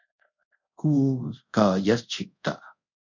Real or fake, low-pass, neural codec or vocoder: fake; 7.2 kHz; codec, 24 kHz, 0.5 kbps, DualCodec